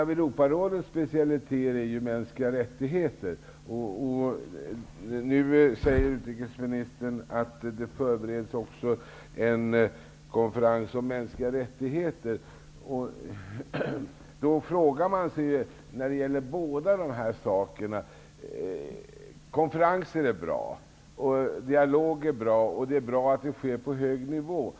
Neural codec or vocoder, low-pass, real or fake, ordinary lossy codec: none; none; real; none